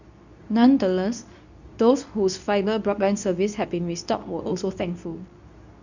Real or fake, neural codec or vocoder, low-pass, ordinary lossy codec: fake; codec, 24 kHz, 0.9 kbps, WavTokenizer, medium speech release version 2; 7.2 kHz; none